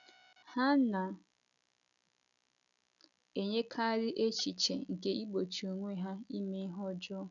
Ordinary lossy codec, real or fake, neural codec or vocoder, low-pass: none; real; none; 7.2 kHz